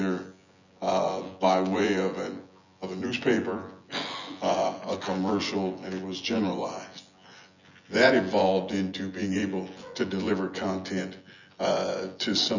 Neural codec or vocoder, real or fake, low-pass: vocoder, 24 kHz, 100 mel bands, Vocos; fake; 7.2 kHz